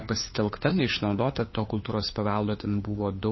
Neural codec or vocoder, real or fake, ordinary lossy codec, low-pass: codec, 16 kHz, 4 kbps, FunCodec, trained on LibriTTS, 50 frames a second; fake; MP3, 24 kbps; 7.2 kHz